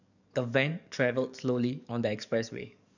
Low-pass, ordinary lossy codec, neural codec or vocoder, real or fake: 7.2 kHz; none; codec, 44.1 kHz, 7.8 kbps, DAC; fake